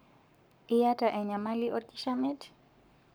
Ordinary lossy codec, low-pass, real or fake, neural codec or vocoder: none; none; fake; codec, 44.1 kHz, 7.8 kbps, Pupu-Codec